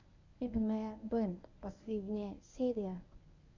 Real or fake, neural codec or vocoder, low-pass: fake; codec, 24 kHz, 0.9 kbps, WavTokenizer, medium speech release version 1; 7.2 kHz